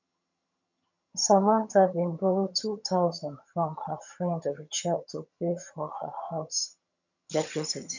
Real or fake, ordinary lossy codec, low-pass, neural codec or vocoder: fake; none; 7.2 kHz; vocoder, 22.05 kHz, 80 mel bands, HiFi-GAN